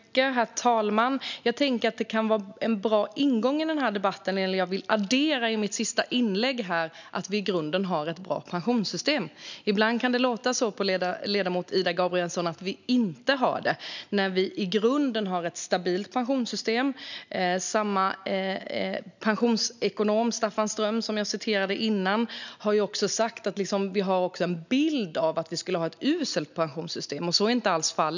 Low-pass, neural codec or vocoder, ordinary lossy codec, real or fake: 7.2 kHz; none; none; real